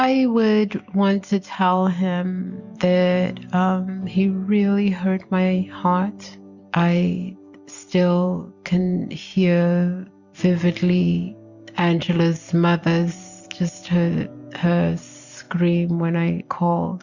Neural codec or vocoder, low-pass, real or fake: none; 7.2 kHz; real